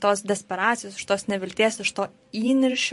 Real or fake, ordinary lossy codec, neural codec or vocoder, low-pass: fake; MP3, 48 kbps; vocoder, 44.1 kHz, 128 mel bands every 512 samples, BigVGAN v2; 14.4 kHz